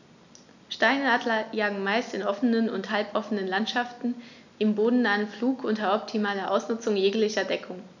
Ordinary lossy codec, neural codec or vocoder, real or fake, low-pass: none; none; real; 7.2 kHz